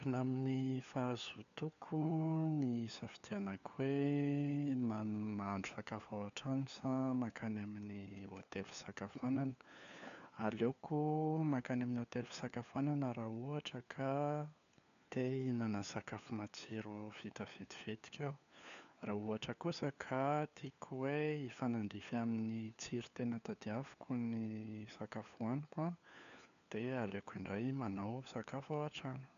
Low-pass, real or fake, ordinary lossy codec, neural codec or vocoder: 7.2 kHz; fake; none; codec, 16 kHz, 4 kbps, FunCodec, trained on LibriTTS, 50 frames a second